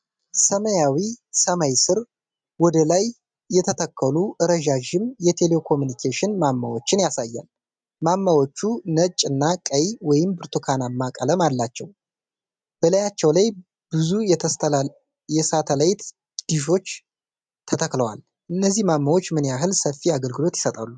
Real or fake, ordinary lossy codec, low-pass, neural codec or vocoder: real; MP3, 96 kbps; 9.9 kHz; none